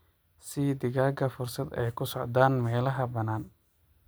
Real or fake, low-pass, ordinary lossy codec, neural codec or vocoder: real; none; none; none